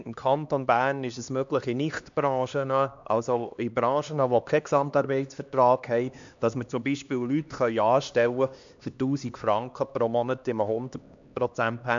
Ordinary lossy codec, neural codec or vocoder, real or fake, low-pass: MP3, 64 kbps; codec, 16 kHz, 2 kbps, X-Codec, HuBERT features, trained on LibriSpeech; fake; 7.2 kHz